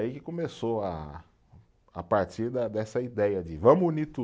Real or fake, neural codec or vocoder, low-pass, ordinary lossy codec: real; none; none; none